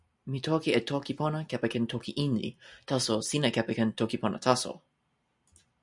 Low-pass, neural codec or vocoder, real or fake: 10.8 kHz; none; real